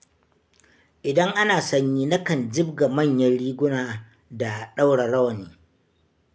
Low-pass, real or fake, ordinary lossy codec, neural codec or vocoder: none; real; none; none